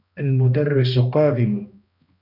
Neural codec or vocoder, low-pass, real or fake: codec, 16 kHz, 1 kbps, X-Codec, HuBERT features, trained on balanced general audio; 5.4 kHz; fake